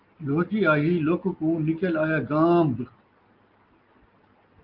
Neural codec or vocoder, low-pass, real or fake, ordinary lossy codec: none; 5.4 kHz; real; Opus, 32 kbps